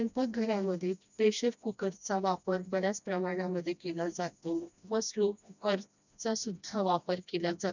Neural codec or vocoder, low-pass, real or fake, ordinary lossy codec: codec, 16 kHz, 1 kbps, FreqCodec, smaller model; 7.2 kHz; fake; none